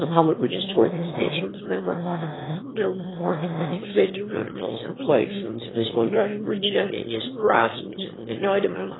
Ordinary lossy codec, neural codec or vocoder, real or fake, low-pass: AAC, 16 kbps; autoencoder, 22.05 kHz, a latent of 192 numbers a frame, VITS, trained on one speaker; fake; 7.2 kHz